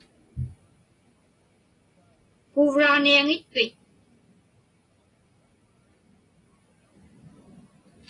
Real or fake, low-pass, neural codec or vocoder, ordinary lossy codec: real; 10.8 kHz; none; AAC, 32 kbps